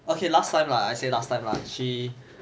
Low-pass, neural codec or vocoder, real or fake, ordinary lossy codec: none; none; real; none